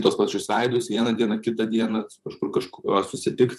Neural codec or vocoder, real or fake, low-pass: vocoder, 44.1 kHz, 128 mel bands, Pupu-Vocoder; fake; 14.4 kHz